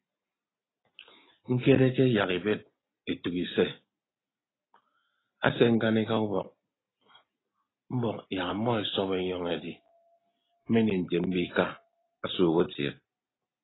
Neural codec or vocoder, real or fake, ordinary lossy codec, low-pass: none; real; AAC, 16 kbps; 7.2 kHz